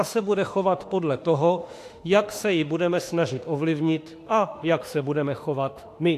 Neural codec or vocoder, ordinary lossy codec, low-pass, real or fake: autoencoder, 48 kHz, 32 numbers a frame, DAC-VAE, trained on Japanese speech; AAC, 64 kbps; 14.4 kHz; fake